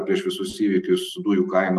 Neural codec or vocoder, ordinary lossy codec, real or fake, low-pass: none; Opus, 32 kbps; real; 14.4 kHz